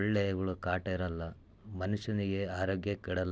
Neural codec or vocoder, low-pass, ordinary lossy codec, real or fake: none; none; none; real